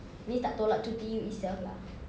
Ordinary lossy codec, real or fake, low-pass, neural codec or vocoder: none; real; none; none